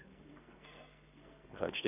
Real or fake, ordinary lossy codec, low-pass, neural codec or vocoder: fake; none; 3.6 kHz; codec, 44.1 kHz, 2.6 kbps, SNAC